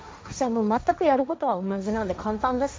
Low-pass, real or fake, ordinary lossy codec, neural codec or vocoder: none; fake; none; codec, 16 kHz, 1.1 kbps, Voila-Tokenizer